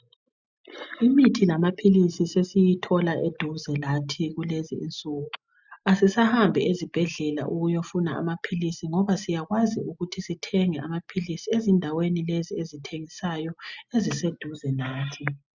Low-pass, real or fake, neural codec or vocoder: 7.2 kHz; real; none